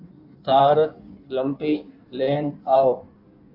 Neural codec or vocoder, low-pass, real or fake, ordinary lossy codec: codec, 16 kHz in and 24 kHz out, 1.1 kbps, FireRedTTS-2 codec; 5.4 kHz; fake; AAC, 48 kbps